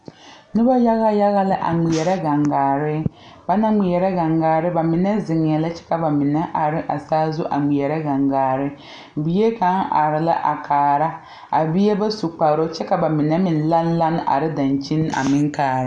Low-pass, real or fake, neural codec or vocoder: 9.9 kHz; real; none